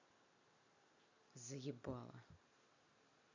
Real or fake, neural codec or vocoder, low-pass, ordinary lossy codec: real; none; 7.2 kHz; none